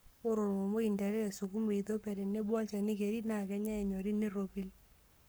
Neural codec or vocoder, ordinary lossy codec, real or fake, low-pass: codec, 44.1 kHz, 7.8 kbps, Pupu-Codec; none; fake; none